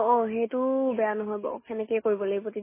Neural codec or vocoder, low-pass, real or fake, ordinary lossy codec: none; 3.6 kHz; real; MP3, 16 kbps